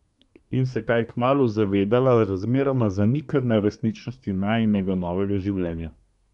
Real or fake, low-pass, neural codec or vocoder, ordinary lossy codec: fake; 10.8 kHz; codec, 24 kHz, 1 kbps, SNAC; none